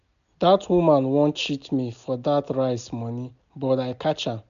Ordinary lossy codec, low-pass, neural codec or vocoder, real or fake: none; 7.2 kHz; none; real